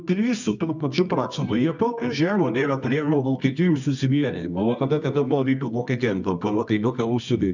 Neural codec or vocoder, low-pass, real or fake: codec, 24 kHz, 0.9 kbps, WavTokenizer, medium music audio release; 7.2 kHz; fake